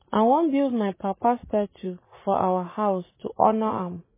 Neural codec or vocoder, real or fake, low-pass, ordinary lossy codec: vocoder, 44.1 kHz, 80 mel bands, Vocos; fake; 3.6 kHz; MP3, 16 kbps